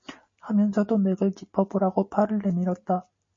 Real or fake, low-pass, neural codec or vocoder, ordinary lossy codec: real; 7.2 kHz; none; MP3, 32 kbps